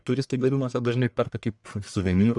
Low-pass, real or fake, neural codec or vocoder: 10.8 kHz; fake; codec, 44.1 kHz, 1.7 kbps, Pupu-Codec